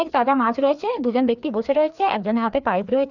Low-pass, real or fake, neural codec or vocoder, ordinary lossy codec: 7.2 kHz; fake; codec, 16 kHz, 2 kbps, FreqCodec, larger model; none